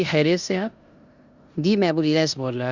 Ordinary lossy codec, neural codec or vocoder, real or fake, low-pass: Opus, 64 kbps; codec, 16 kHz in and 24 kHz out, 0.9 kbps, LongCat-Audio-Codec, four codebook decoder; fake; 7.2 kHz